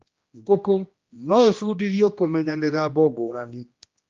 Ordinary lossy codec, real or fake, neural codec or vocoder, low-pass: Opus, 32 kbps; fake; codec, 16 kHz, 1 kbps, X-Codec, HuBERT features, trained on general audio; 7.2 kHz